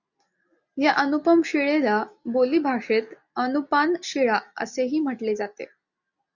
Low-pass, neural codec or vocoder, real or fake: 7.2 kHz; none; real